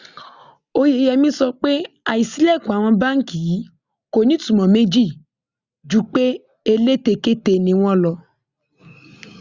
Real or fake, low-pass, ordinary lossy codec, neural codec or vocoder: real; 7.2 kHz; Opus, 64 kbps; none